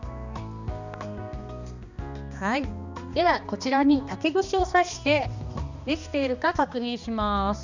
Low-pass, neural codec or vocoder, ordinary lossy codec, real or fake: 7.2 kHz; codec, 16 kHz, 2 kbps, X-Codec, HuBERT features, trained on balanced general audio; none; fake